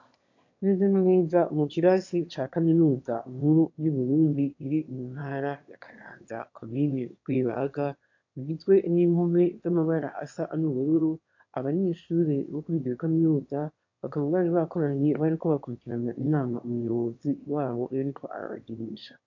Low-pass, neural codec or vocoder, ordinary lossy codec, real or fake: 7.2 kHz; autoencoder, 22.05 kHz, a latent of 192 numbers a frame, VITS, trained on one speaker; AAC, 48 kbps; fake